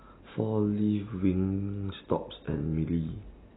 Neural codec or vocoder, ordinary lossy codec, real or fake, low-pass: none; AAC, 16 kbps; real; 7.2 kHz